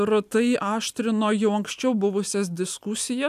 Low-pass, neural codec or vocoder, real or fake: 14.4 kHz; none; real